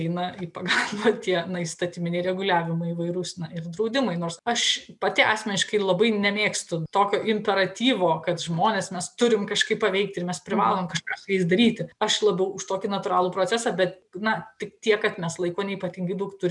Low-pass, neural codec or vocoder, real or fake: 10.8 kHz; none; real